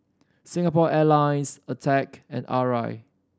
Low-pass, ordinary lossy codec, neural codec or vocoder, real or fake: none; none; none; real